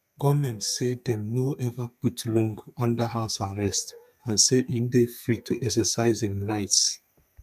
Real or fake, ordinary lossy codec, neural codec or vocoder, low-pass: fake; none; codec, 32 kHz, 1.9 kbps, SNAC; 14.4 kHz